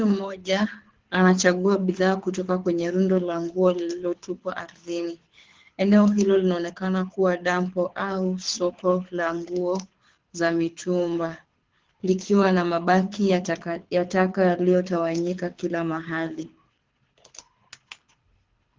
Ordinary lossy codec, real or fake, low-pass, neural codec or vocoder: Opus, 16 kbps; fake; 7.2 kHz; codec, 24 kHz, 6 kbps, HILCodec